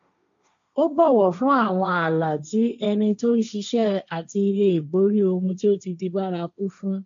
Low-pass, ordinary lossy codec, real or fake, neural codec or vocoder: 7.2 kHz; MP3, 64 kbps; fake; codec, 16 kHz, 1.1 kbps, Voila-Tokenizer